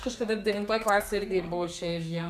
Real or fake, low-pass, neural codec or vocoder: fake; 14.4 kHz; codec, 32 kHz, 1.9 kbps, SNAC